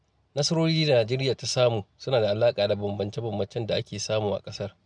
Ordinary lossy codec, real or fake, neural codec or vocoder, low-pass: none; real; none; 9.9 kHz